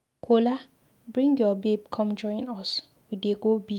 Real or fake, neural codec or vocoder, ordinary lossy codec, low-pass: fake; autoencoder, 48 kHz, 128 numbers a frame, DAC-VAE, trained on Japanese speech; Opus, 32 kbps; 19.8 kHz